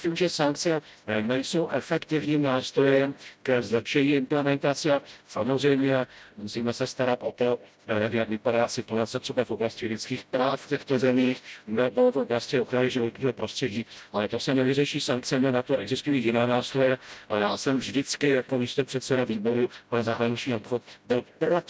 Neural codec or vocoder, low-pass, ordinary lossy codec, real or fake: codec, 16 kHz, 0.5 kbps, FreqCodec, smaller model; none; none; fake